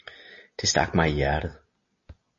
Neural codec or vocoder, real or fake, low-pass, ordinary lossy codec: none; real; 7.2 kHz; MP3, 32 kbps